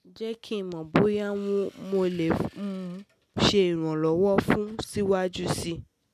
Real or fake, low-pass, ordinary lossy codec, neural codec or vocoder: real; 14.4 kHz; none; none